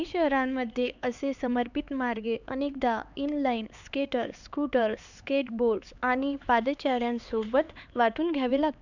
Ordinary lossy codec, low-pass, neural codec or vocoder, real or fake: none; 7.2 kHz; codec, 16 kHz, 4 kbps, X-Codec, HuBERT features, trained on LibriSpeech; fake